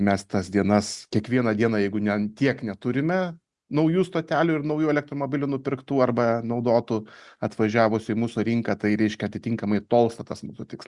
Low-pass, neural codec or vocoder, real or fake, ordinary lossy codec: 10.8 kHz; none; real; Opus, 64 kbps